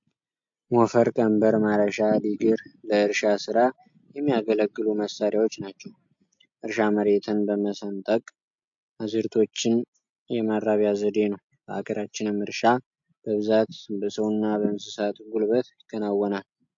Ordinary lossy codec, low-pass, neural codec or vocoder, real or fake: MP3, 48 kbps; 7.2 kHz; none; real